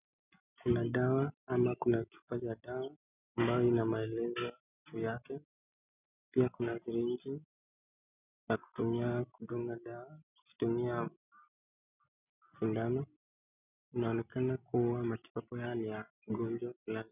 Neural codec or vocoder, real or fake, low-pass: none; real; 3.6 kHz